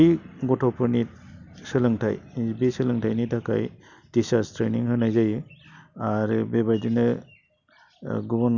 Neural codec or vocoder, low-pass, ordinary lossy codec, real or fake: none; 7.2 kHz; none; real